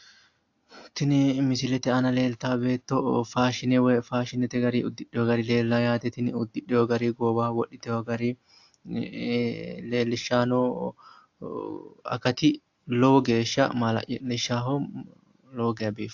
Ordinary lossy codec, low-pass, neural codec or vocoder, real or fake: AAC, 48 kbps; 7.2 kHz; none; real